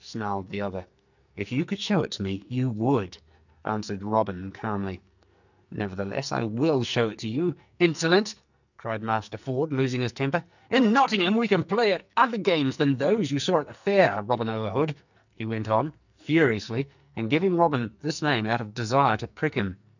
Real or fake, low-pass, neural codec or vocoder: fake; 7.2 kHz; codec, 44.1 kHz, 2.6 kbps, SNAC